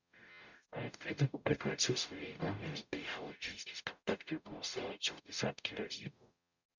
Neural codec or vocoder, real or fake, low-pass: codec, 44.1 kHz, 0.9 kbps, DAC; fake; 7.2 kHz